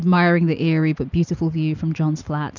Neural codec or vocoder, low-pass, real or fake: none; 7.2 kHz; real